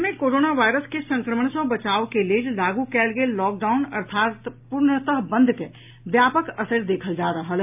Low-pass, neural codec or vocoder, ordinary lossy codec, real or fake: 3.6 kHz; none; AAC, 32 kbps; real